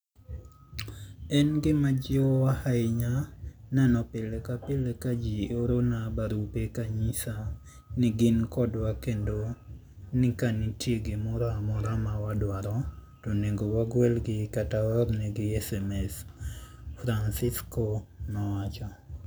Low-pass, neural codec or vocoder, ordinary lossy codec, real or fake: none; none; none; real